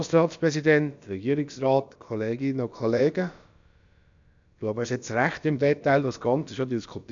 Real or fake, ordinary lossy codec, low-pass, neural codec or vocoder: fake; MP3, 64 kbps; 7.2 kHz; codec, 16 kHz, about 1 kbps, DyCAST, with the encoder's durations